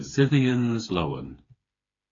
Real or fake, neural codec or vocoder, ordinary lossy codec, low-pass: fake; codec, 16 kHz, 4 kbps, FreqCodec, smaller model; AAC, 32 kbps; 7.2 kHz